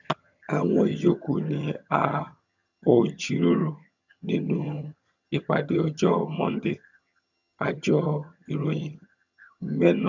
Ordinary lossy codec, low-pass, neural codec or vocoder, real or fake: none; 7.2 kHz; vocoder, 22.05 kHz, 80 mel bands, HiFi-GAN; fake